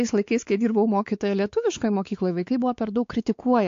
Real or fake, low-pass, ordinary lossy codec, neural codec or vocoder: fake; 7.2 kHz; AAC, 48 kbps; codec, 16 kHz, 4 kbps, X-Codec, WavLM features, trained on Multilingual LibriSpeech